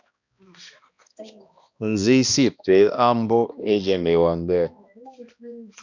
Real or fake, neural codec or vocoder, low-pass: fake; codec, 16 kHz, 1 kbps, X-Codec, HuBERT features, trained on balanced general audio; 7.2 kHz